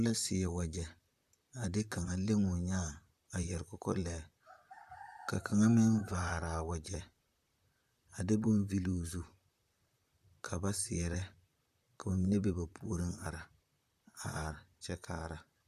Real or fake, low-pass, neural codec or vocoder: fake; 14.4 kHz; vocoder, 44.1 kHz, 128 mel bands, Pupu-Vocoder